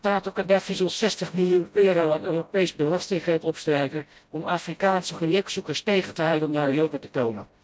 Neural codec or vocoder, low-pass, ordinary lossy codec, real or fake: codec, 16 kHz, 0.5 kbps, FreqCodec, smaller model; none; none; fake